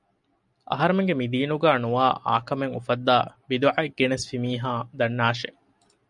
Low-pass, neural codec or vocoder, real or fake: 10.8 kHz; none; real